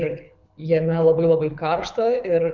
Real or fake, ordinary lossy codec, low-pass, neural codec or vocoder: fake; MP3, 64 kbps; 7.2 kHz; codec, 24 kHz, 6 kbps, HILCodec